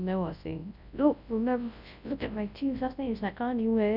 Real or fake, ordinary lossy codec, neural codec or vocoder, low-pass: fake; none; codec, 24 kHz, 0.9 kbps, WavTokenizer, large speech release; 5.4 kHz